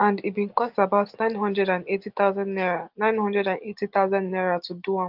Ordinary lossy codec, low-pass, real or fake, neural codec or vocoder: Opus, 24 kbps; 5.4 kHz; real; none